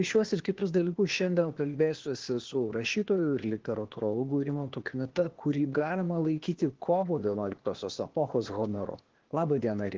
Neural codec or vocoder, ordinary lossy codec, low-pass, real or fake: codec, 16 kHz, 0.8 kbps, ZipCodec; Opus, 16 kbps; 7.2 kHz; fake